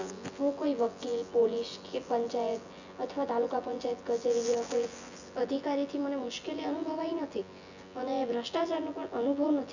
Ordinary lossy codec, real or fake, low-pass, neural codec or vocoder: none; fake; 7.2 kHz; vocoder, 24 kHz, 100 mel bands, Vocos